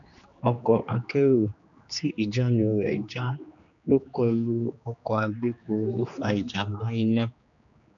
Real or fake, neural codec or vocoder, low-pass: fake; codec, 16 kHz, 2 kbps, X-Codec, HuBERT features, trained on general audio; 7.2 kHz